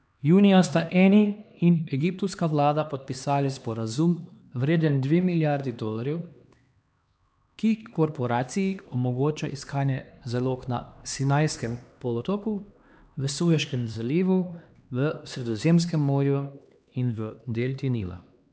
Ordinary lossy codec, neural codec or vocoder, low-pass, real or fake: none; codec, 16 kHz, 2 kbps, X-Codec, HuBERT features, trained on LibriSpeech; none; fake